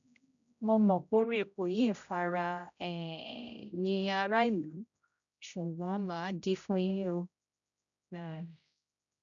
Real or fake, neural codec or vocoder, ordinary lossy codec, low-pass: fake; codec, 16 kHz, 0.5 kbps, X-Codec, HuBERT features, trained on general audio; none; 7.2 kHz